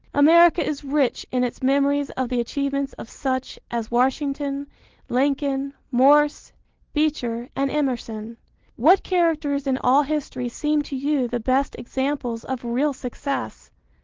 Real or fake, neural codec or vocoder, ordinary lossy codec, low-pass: real; none; Opus, 16 kbps; 7.2 kHz